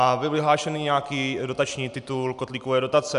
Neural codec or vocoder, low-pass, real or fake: none; 10.8 kHz; real